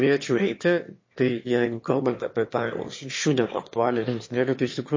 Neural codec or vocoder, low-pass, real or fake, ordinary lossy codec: autoencoder, 22.05 kHz, a latent of 192 numbers a frame, VITS, trained on one speaker; 7.2 kHz; fake; MP3, 32 kbps